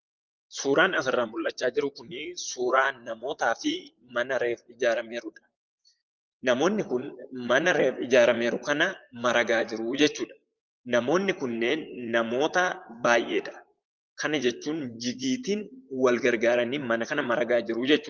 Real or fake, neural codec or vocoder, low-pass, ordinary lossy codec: fake; vocoder, 44.1 kHz, 128 mel bands, Pupu-Vocoder; 7.2 kHz; Opus, 32 kbps